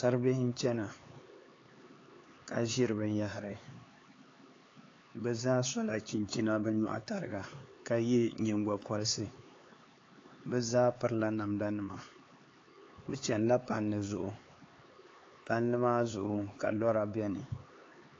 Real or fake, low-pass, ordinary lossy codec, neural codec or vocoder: fake; 7.2 kHz; AAC, 32 kbps; codec, 16 kHz, 4 kbps, X-Codec, HuBERT features, trained on LibriSpeech